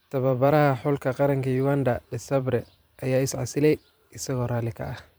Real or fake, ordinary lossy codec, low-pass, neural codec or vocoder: fake; none; none; vocoder, 44.1 kHz, 128 mel bands every 512 samples, BigVGAN v2